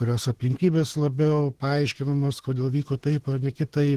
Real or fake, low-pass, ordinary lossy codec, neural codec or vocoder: fake; 14.4 kHz; Opus, 16 kbps; autoencoder, 48 kHz, 32 numbers a frame, DAC-VAE, trained on Japanese speech